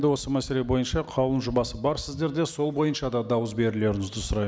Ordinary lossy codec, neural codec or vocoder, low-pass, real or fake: none; none; none; real